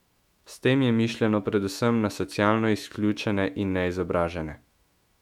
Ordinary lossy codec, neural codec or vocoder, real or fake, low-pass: MP3, 96 kbps; autoencoder, 48 kHz, 128 numbers a frame, DAC-VAE, trained on Japanese speech; fake; 19.8 kHz